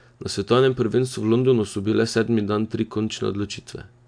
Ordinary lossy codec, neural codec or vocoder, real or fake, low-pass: AAC, 64 kbps; none; real; 9.9 kHz